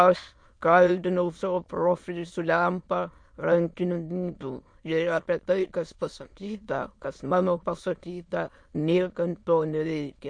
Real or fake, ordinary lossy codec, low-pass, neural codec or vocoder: fake; MP3, 48 kbps; 9.9 kHz; autoencoder, 22.05 kHz, a latent of 192 numbers a frame, VITS, trained on many speakers